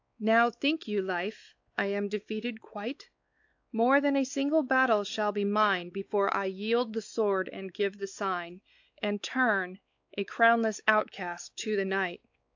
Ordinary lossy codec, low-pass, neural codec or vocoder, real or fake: AAC, 48 kbps; 7.2 kHz; codec, 16 kHz, 4 kbps, X-Codec, WavLM features, trained on Multilingual LibriSpeech; fake